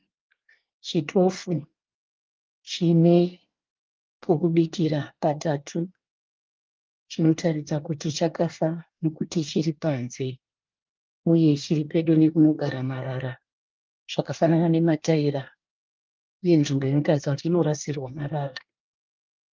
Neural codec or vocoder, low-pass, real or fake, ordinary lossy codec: codec, 24 kHz, 1 kbps, SNAC; 7.2 kHz; fake; Opus, 32 kbps